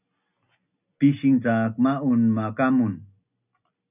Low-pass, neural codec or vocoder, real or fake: 3.6 kHz; none; real